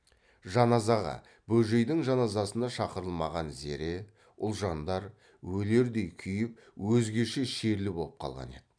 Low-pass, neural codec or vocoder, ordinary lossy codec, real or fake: 9.9 kHz; none; none; real